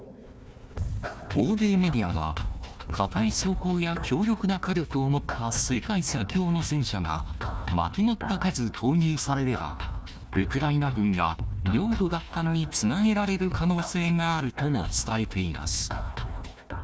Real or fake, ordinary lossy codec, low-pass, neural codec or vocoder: fake; none; none; codec, 16 kHz, 1 kbps, FunCodec, trained on Chinese and English, 50 frames a second